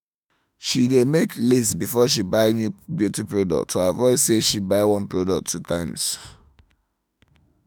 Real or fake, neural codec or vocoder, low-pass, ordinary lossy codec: fake; autoencoder, 48 kHz, 32 numbers a frame, DAC-VAE, trained on Japanese speech; none; none